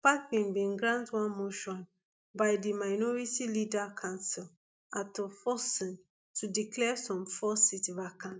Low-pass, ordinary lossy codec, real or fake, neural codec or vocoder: none; none; real; none